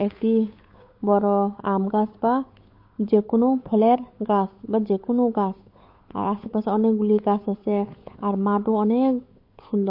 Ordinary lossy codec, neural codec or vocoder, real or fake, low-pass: MP3, 32 kbps; codec, 16 kHz, 8 kbps, FunCodec, trained on Chinese and English, 25 frames a second; fake; 5.4 kHz